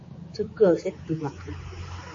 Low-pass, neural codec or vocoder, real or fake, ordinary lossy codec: 7.2 kHz; codec, 16 kHz, 4 kbps, X-Codec, HuBERT features, trained on general audio; fake; MP3, 32 kbps